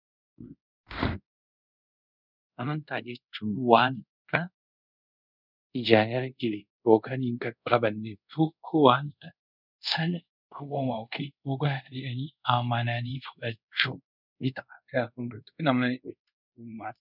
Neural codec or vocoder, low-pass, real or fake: codec, 24 kHz, 0.5 kbps, DualCodec; 5.4 kHz; fake